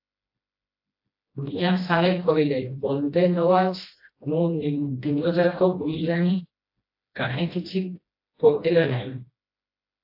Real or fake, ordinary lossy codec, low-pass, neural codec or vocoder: fake; AAC, 32 kbps; 5.4 kHz; codec, 16 kHz, 1 kbps, FreqCodec, smaller model